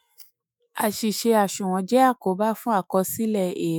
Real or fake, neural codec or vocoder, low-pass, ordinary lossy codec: fake; autoencoder, 48 kHz, 128 numbers a frame, DAC-VAE, trained on Japanese speech; none; none